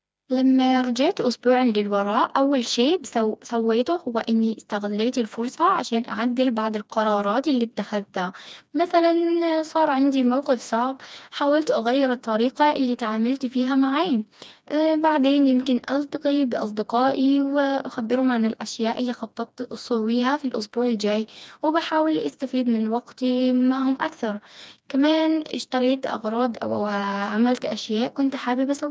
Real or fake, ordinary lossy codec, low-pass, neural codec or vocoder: fake; none; none; codec, 16 kHz, 2 kbps, FreqCodec, smaller model